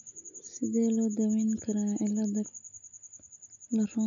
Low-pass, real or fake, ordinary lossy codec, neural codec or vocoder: 7.2 kHz; real; none; none